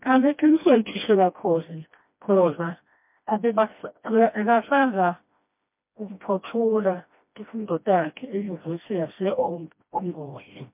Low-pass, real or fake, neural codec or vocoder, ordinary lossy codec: 3.6 kHz; fake; codec, 16 kHz, 1 kbps, FreqCodec, smaller model; MP3, 32 kbps